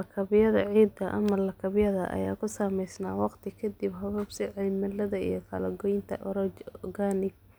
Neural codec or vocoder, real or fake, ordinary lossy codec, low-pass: none; real; none; none